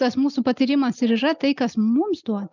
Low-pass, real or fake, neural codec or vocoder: 7.2 kHz; real; none